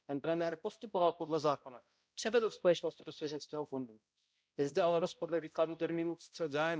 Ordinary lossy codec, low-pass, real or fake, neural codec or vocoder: none; none; fake; codec, 16 kHz, 0.5 kbps, X-Codec, HuBERT features, trained on balanced general audio